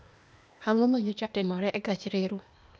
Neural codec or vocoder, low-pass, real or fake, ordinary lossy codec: codec, 16 kHz, 0.8 kbps, ZipCodec; none; fake; none